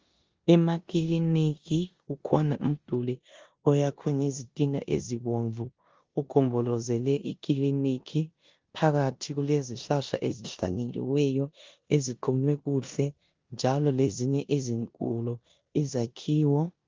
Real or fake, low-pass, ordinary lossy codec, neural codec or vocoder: fake; 7.2 kHz; Opus, 32 kbps; codec, 16 kHz in and 24 kHz out, 0.9 kbps, LongCat-Audio-Codec, four codebook decoder